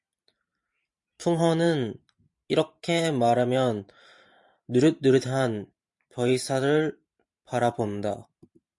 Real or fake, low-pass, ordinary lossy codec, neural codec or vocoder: fake; 10.8 kHz; MP3, 64 kbps; vocoder, 44.1 kHz, 128 mel bands every 512 samples, BigVGAN v2